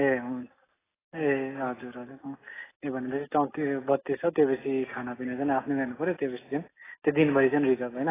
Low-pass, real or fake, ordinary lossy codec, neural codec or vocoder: 3.6 kHz; real; AAC, 16 kbps; none